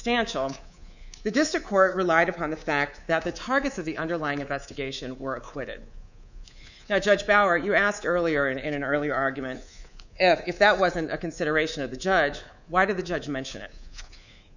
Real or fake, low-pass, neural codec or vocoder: fake; 7.2 kHz; codec, 24 kHz, 3.1 kbps, DualCodec